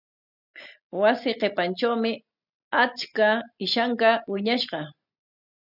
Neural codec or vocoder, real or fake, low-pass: none; real; 5.4 kHz